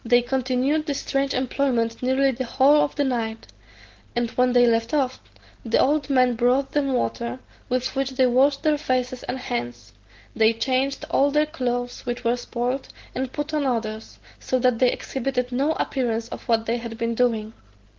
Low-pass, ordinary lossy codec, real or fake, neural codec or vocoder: 7.2 kHz; Opus, 16 kbps; real; none